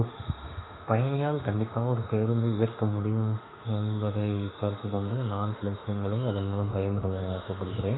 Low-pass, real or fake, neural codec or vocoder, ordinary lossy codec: 7.2 kHz; fake; autoencoder, 48 kHz, 32 numbers a frame, DAC-VAE, trained on Japanese speech; AAC, 16 kbps